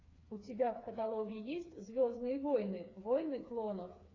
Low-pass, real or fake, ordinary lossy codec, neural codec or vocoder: 7.2 kHz; fake; AAC, 32 kbps; codec, 16 kHz, 4 kbps, FreqCodec, smaller model